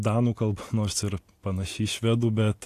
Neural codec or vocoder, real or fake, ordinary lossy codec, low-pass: none; real; AAC, 64 kbps; 14.4 kHz